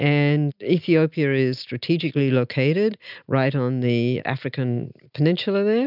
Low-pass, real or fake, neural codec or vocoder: 5.4 kHz; real; none